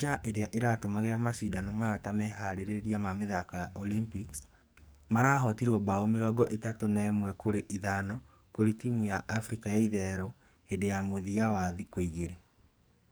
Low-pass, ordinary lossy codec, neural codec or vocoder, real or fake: none; none; codec, 44.1 kHz, 2.6 kbps, SNAC; fake